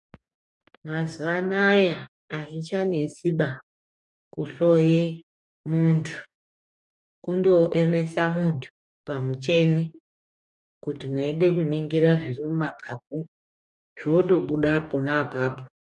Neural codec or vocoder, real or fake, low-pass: codec, 44.1 kHz, 2.6 kbps, DAC; fake; 10.8 kHz